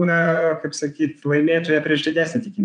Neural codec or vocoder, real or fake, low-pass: vocoder, 22.05 kHz, 80 mel bands, Vocos; fake; 9.9 kHz